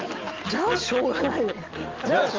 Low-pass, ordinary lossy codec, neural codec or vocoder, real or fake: 7.2 kHz; Opus, 24 kbps; none; real